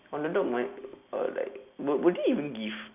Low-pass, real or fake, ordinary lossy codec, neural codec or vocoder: 3.6 kHz; real; none; none